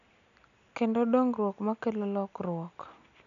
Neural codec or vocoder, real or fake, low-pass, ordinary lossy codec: none; real; 7.2 kHz; none